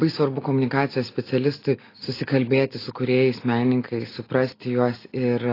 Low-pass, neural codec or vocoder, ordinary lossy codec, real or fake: 5.4 kHz; none; AAC, 24 kbps; real